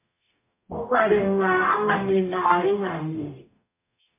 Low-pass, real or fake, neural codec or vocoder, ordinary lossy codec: 3.6 kHz; fake; codec, 44.1 kHz, 0.9 kbps, DAC; MP3, 32 kbps